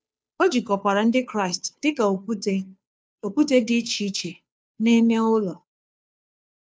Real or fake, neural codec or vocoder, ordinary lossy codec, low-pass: fake; codec, 16 kHz, 2 kbps, FunCodec, trained on Chinese and English, 25 frames a second; none; none